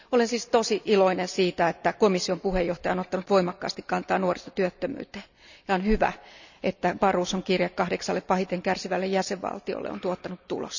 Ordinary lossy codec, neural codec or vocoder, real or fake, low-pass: none; none; real; 7.2 kHz